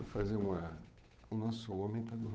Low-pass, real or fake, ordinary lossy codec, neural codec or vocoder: none; fake; none; codec, 16 kHz, 8 kbps, FunCodec, trained on Chinese and English, 25 frames a second